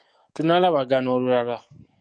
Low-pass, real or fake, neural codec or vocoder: 9.9 kHz; fake; codec, 44.1 kHz, 7.8 kbps, DAC